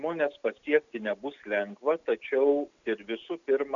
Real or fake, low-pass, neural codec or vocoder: real; 7.2 kHz; none